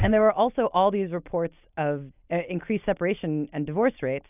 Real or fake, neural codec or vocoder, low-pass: real; none; 3.6 kHz